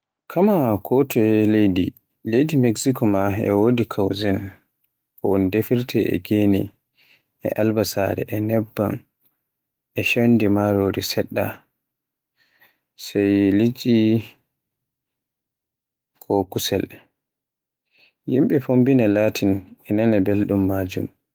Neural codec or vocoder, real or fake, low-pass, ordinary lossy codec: autoencoder, 48 kHz, 128 numbers a frame, DAC-VAE, trained on Japanese speech; fake; 19.8 kHz; Opus, 24 kbps